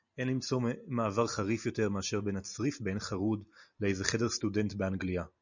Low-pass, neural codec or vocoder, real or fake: 7.2 kHz; none; real